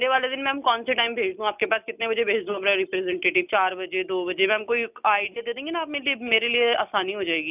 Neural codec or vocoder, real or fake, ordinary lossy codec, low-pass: none; real; none; 3.6 kHz